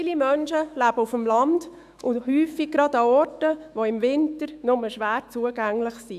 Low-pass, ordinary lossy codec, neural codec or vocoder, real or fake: 14.4 kHz; none; autoencoder, 48 kHz, 128 numbers a frame, DAC-VAE, trained on Japanese speech; fake